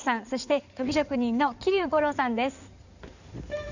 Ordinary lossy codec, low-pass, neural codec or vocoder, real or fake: none; 7.2 kHz; codec, 16 kHz in and 24 kHz out, 2.2 kbps, FireRedTTS-2 codec; fake